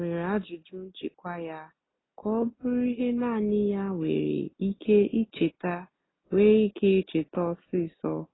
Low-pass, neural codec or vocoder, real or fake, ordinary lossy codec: 7.2 kHz; none; real; AAC, 16 kbps